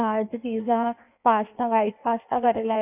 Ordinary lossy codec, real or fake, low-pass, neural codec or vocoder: none; fake; 3.6 kHz; codec, 16 kHz in and 24 kHz out, 1.1 kbps, FireRedTTS-2 codec